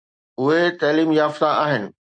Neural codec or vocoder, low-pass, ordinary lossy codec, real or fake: none; 9.9 kHz; MP3, 64 kbps; real